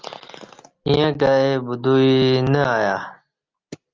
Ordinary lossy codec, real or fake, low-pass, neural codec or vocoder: Opus, 32 kbps; real; 7.2 kHz; none